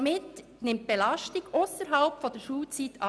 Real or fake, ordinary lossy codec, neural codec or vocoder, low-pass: real; none; none; none